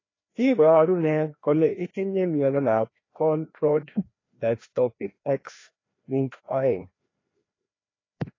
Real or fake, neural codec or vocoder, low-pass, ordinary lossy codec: fake; codec, 16 kHz, 1 kbps, FreqCodec, larger model; 7.2 kHz; AAC, 32 kbps